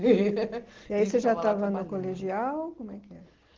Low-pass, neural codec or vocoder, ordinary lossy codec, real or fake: 7.2 kHz; none; Opus, 16 kbps; real